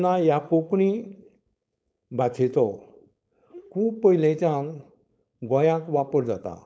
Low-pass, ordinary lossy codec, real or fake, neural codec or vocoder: none; none; fake; codec, 16 kHz, 4.8 kbps, FACodec